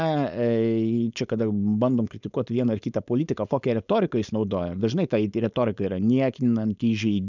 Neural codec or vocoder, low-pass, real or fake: codec, 16 kHz, 4.8 kbps, FACodec; 7.2 kHz; fake